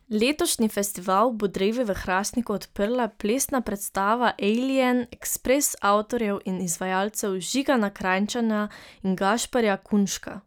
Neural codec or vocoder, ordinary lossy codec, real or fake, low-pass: none; none; real; none